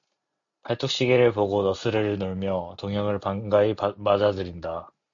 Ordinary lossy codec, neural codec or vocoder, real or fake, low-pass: AAC, 64 kbps; none; real; 7.2 kHz